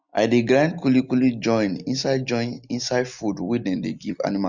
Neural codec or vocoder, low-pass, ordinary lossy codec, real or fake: none; 7.2 kHz; none; real